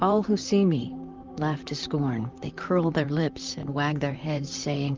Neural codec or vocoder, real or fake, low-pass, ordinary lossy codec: vocoder, 44.1 kHz, 128 mel bands, Pupu-Vocoder; fake; 7.2 kHz; Opus, 24 kbps